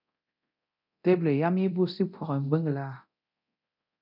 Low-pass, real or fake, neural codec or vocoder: 5.4 kHz; fake; codec, 24 kHz, 0.9 kbps, DualCodec